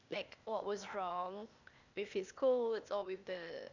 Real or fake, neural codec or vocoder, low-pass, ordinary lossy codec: fake; codec, 16 kHz, 0.8 kbps, ZipCodec; 7.2 kHz; none